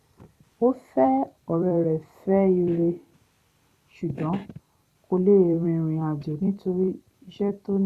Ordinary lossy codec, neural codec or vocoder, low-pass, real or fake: Opus, 64 kbps; vocoder, 44.1 kHz, 128 mel bands every 256 samples, BigVGAN v2; 14.4 kHz; fake